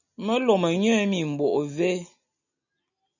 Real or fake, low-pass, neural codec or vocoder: real; 7.2 kHz; none